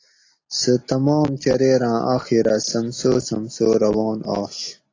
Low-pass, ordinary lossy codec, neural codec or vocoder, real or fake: 7.2 kHz; AAC, 32 kbps; none; real